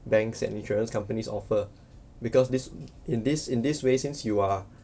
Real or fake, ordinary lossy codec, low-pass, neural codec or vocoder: real; none; none; none